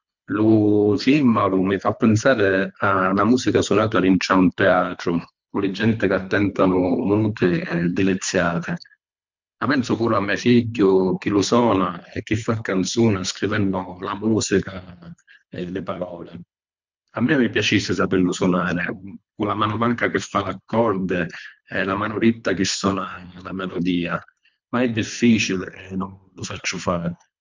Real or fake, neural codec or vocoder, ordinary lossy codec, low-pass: fake; codec, 24 kHz, 3 kbps, HILCodec; MP3, 64 kbps; 7.2 kHz